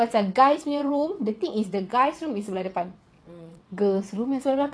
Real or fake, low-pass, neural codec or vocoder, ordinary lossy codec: fake; none; vocoder, 22.05 kHz, 80 mel bands, WaveNeXt; none